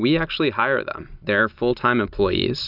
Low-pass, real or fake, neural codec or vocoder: 5.4 kHz; real; none